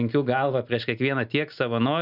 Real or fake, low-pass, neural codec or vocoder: real; 5.4 kHz; none